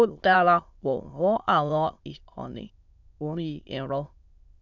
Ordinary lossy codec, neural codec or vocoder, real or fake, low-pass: none; autoencoder, 22.05 kHz, a latent of 192 numbers a frame, VITS, trained on many speakers; fake; 7.2 kHz